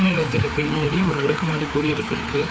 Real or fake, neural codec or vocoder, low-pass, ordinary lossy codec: fake; codec, 16 kHz, 4 kbps, FreqCodec, larger model; none; none